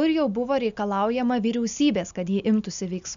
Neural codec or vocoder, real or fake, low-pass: none; real; 7.2 kHz